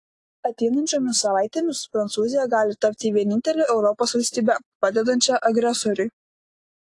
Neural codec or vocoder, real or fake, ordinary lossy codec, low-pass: none; real; AAC, 48 kbps; 10.8 kHz